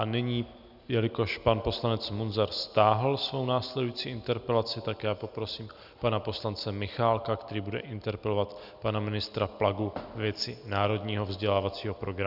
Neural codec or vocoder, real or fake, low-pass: none; real; 5.4 kHz